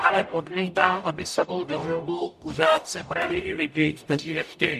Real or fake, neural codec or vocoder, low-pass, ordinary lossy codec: fake; codec, 44.1 kHz, 0.9 kbps, DAC; 14.4 kHz; MP3, 96 kbps